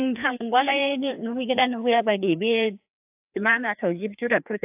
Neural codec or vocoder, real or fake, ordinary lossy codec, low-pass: codec, 16 kHz, 2 kbps, FreqCodec, larger model; fake; AAC, 32 kbps; 3.6 kHz